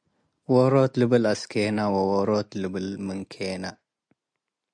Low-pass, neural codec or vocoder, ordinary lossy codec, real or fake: 9.9 kHz; none; MP3, 64 kbps; real